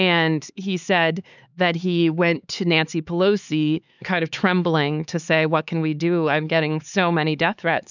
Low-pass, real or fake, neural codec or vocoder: 7.2 kHz; fake; codec, 16 kHz, 4 kbps, X-Codec, HuBERT features, trained on LibriSpeech